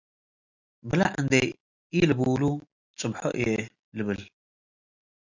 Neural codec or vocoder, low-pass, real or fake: none; 7.2 kHz; real